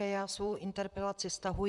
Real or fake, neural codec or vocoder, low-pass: fake; vocoder, 44.1 kHz, 128 mel bands, Pupu-Vocoder; 10.8 kHz